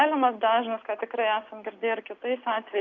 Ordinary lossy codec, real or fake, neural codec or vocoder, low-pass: AAC, 48 kbps; real; none; 7.2 kHz